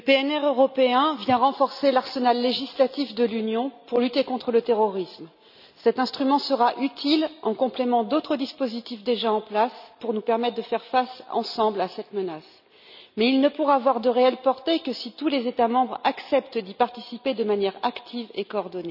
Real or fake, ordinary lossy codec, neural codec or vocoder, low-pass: real; none; none; 5.4 kHz